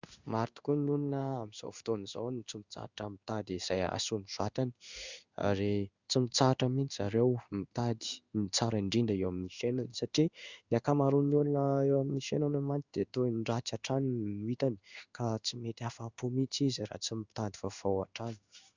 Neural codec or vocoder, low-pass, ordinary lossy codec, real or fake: codec, 16 kHz in and 24 kHz out, 1 kbps, XY-Tokenizer; 7.2 kHz; Opus, 64 kbps; fake